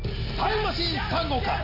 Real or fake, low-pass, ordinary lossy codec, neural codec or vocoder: real; 5.4 kHz; none; none